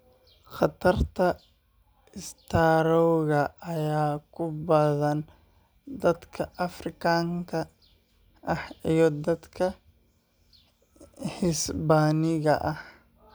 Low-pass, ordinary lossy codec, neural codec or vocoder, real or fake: none; none; none; real